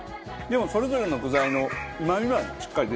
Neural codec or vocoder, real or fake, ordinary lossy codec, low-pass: none; real; none; none